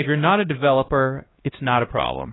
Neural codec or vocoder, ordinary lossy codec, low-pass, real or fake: codec, 16 kHz, 1 kbps, X-Codec, HuBERT features, trained on LibriSpeech; AAC, 16 kbps; 7.2 kHz; fake